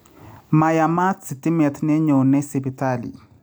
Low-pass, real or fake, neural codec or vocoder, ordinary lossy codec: none; real; none; none